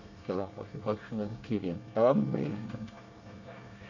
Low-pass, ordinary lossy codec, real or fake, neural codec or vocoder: 7.2 kHz; none; fake; codec, 24 kHz, 1 kbps, SNAC